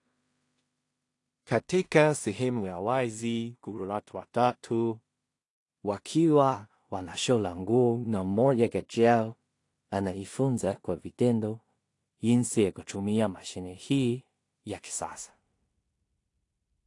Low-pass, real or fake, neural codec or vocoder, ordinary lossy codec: 10.8 kHz; fake; codec, 16 kHz in and 24 kHz out, 0.4 kbps, LongCat-Audio-Codec, two codebook decoder; AAC, 48 kbps